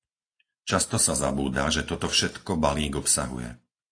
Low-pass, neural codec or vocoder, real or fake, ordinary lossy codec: 9.9 kHz; none; real; AAC, 48 kbps